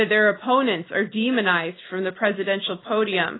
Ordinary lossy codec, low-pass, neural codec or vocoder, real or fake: AAC, 16 kbps; 7.2 kHz; none; real